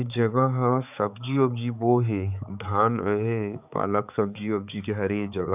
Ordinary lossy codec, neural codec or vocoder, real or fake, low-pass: none; codec, 16 kHz, 4 kbps, X-Codec, HuBERT features, trained on balanced general audio; fake; 3.6 kHz